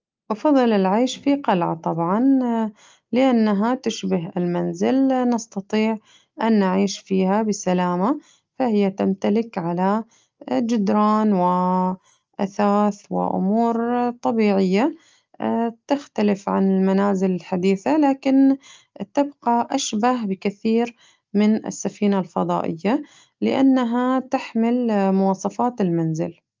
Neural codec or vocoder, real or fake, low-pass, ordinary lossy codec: none; real; 7.2 kHz; Opus, 24 kbps